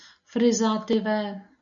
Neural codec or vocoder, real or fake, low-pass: none; real; 7.2 kHz